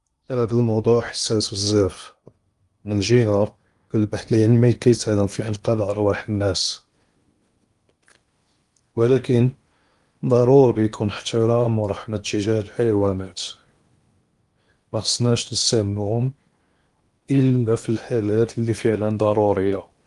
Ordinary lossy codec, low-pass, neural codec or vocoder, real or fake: Opus, 32 kbps; 10.8 kHz; codec, 16 kHz in and 24 kHz out, 0.8 kbps, FocalCodec, streaming, 65536 codes; fake